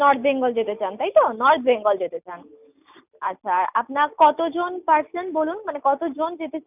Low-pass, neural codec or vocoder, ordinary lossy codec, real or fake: 3.6 kHz; none; none; real